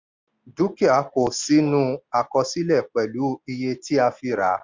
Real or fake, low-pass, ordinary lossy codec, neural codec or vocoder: real; 7.2 kHz; MP3, 64 kbps; none